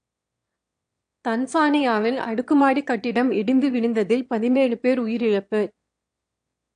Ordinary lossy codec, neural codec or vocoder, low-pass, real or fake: AAC, 64 kbps; autoencoder, 22.05 kHz, a latent of 192 numbers a frame, VITS, trained on one speaker; 9.9 kHz; fake